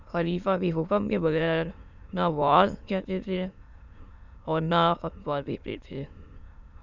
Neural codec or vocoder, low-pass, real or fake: autoencoder, 22.05 kHz, a latent of 192 numbers a frame, VITS, trained on many speakers; 7.2 kHz; fake